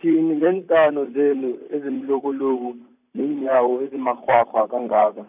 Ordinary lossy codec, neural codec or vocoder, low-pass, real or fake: none; vocoder, 44.1 kHz, 128 mel bands, Pupu-Vocoder; 3.6 kHz; fake